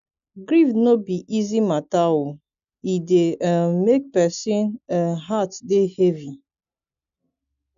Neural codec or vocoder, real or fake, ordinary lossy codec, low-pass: none; real; MP3, 64 kbps; 7.2 kHz